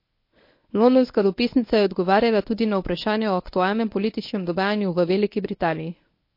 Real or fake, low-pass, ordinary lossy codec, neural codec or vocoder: fake; 5.4 kHz; MP3, 32 kbps; codec, 24 kHz, 0.9 kbps, WavTokenizer, medium speech release version 1